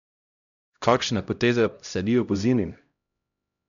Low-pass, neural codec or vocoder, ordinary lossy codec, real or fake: 7.2 kHz; codec, 16 kHz, 0.5 kbps, X-Codec, HuBERT features, trained on LibriSpeech; none; fake